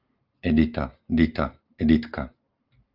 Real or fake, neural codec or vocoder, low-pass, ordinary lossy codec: real; none; 5.4 kHz; Opus, 32 kbps